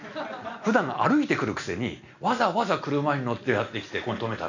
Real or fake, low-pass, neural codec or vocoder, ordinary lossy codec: real; 7.2 kHz; none; none